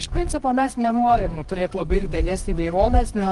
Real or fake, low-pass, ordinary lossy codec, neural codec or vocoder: fake; 10.8 kHz; Opus, 32 kbps; codec, 24 kHz, 0.9 kbps, WavTokenizer, medium music audio release